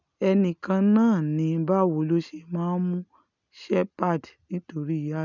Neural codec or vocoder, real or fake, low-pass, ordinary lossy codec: none; real; 7.2 kHz; none